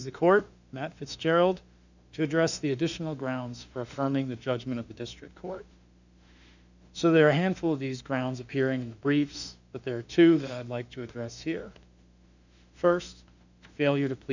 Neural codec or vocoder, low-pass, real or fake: autoencoder, 48 kHz, 32 numbers a frame, DAC-VAE, trained on Japanese speech; 7.2 kHz; fake